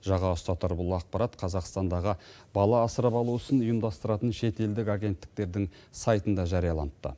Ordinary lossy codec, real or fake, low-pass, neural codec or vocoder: none; real; none; none